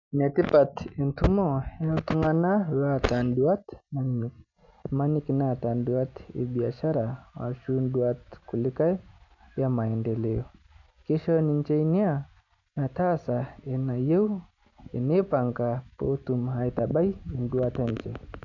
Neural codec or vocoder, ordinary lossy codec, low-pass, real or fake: none; none; 7.2 kHz; real